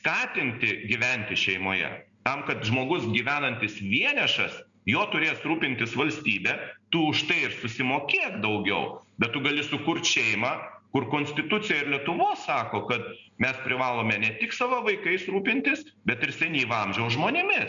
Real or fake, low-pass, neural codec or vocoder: real; 7.2 kHz; none